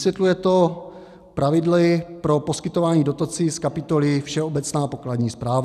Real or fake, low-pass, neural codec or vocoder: real; 14.4 kHz; none